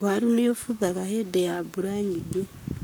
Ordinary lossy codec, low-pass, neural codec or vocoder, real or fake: none; none; codec, 44.1 kHz, 7.8 kbps, Pupu-Codec; fake